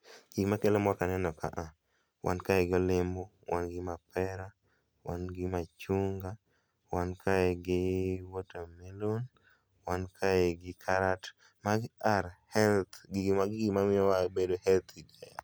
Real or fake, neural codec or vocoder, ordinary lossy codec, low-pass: real; none; none; none